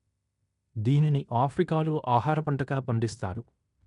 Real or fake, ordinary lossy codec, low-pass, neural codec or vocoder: fake; none; 10.8 kHz; codec, 16 kHz in and 24 kHz out, 0.9 kbps, LongCat-Audio-Codec, fine tuned four codebook decoder